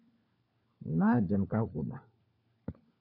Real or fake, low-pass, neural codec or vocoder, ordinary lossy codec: fake; 5.4 kHz; codec, 16 kHz, 4 kbps, FunCodec, trained on LibriTTS, 50 frames a second; MP3, 48 kbps